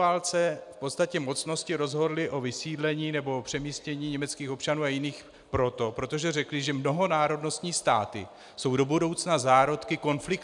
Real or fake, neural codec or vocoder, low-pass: real; none; 10.8 kHz